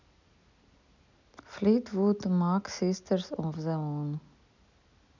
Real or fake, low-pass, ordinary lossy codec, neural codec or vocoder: real; 7.2 kHz; none; none